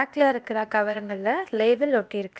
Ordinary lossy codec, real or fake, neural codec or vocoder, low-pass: none; fake; codec, 16 kHz, 0.8 kbps, ZipCodec; none